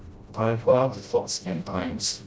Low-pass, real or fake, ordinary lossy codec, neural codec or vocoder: none; fake; none; codec, 16 kHz, 0.5 kbps, FreqCodec, smaller model